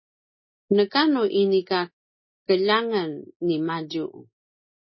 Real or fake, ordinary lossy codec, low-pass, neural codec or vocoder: real; MP3, 24 kbps; 7.2 kHz; none